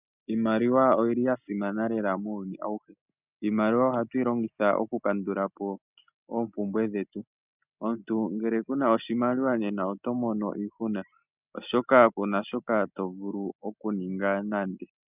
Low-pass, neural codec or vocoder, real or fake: 3.6 kHz; none; real